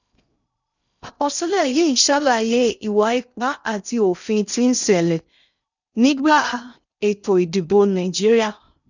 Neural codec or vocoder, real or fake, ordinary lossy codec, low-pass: codec, 16 kHz in and 24 kHz out, 0.6 kbps, FocalCodec, streaming, 2048 codes; fake; none; 7.2 kHz